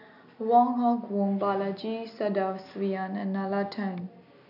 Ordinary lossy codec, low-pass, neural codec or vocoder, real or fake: none; 5.4 kHz; none; real